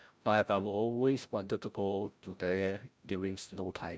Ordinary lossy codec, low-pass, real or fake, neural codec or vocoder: none; none; fake; codec, 16 kHz, 0.5 kbps, FreqCodec, larger model